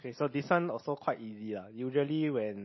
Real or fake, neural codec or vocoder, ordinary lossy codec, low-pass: real; none; MP3, 24 kbps; 7.2 kHz